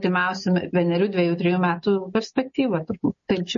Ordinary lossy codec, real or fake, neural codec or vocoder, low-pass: MP3, 32 kbps; real; none; 7.2 kHz